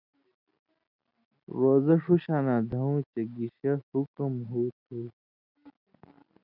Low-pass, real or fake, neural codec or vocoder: 5.4 kHz; real; none